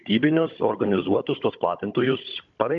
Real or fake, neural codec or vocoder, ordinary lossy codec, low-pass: fake; codec, 16 kHz, 16 kbps, FunCodec, trained on Chinese and English, 50 frames a second; AAC, 64 kbps; 7.2 kHz